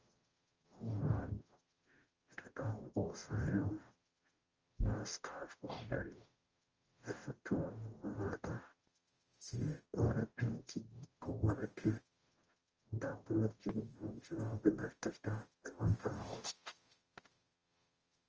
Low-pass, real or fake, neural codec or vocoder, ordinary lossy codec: 7.2 kHz; fake; codec, 44.1 kHz, 0.9 kbps, DAC; Opus, 24 kbps